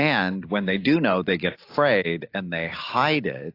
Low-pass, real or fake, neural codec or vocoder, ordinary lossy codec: 5.4 kHz; real; none; AAC, 32 kbps